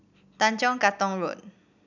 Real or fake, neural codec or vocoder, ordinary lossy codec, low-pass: real; none; none; 7.2 kHz